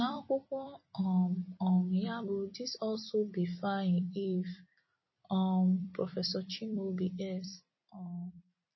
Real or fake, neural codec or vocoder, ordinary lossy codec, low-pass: fake; vocoder, 44.1 kHz, 128 mel bands every 256 samples, BigVGAN v2; MP3, 24 kbps; 7.2 kHz